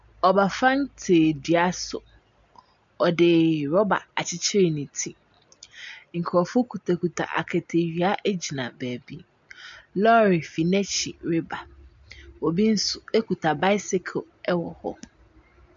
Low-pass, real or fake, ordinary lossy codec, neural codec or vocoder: 7.2 kHz; real; MP3, 64 kbps; none